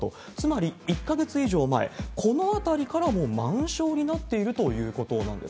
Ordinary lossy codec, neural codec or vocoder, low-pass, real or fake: none; none; none; real